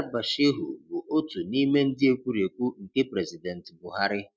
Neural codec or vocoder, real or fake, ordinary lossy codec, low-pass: none; real; none; none